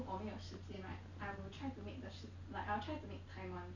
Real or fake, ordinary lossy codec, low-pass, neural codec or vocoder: real; none; 7.2 kHz; none